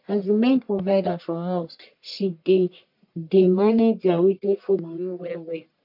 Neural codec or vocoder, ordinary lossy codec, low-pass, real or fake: codec, 44.1 kHz, 1.7 kbps, Pupu-Codec; MP3, 48 kbps; 5.4 kHz; fake